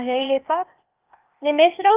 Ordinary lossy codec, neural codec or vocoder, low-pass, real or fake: Opus, 24 kbps; codec, 16 kHz, 0.8 kbps, ZipCodec; 3.6 kHz; fake